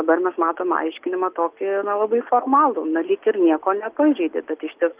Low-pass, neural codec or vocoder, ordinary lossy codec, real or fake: 3.6 kHz; none; Opus, 32 kbps; real